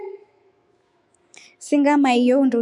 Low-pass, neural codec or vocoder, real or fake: 10.8 kHz; autoencoder, 48 kHz, 128 numbers a frame, DAC-VAE, trained on Japanese speech; fake